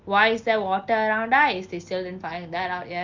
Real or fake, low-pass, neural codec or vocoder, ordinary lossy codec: real; 7.2 kHz; none; Opus, 24 kbps